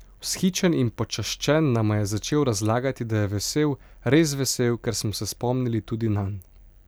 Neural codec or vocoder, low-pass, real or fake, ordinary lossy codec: none; none; real; none